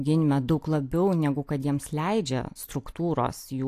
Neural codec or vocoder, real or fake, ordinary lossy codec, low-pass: none; real; AAC, 64 kbps; 14.4 kHz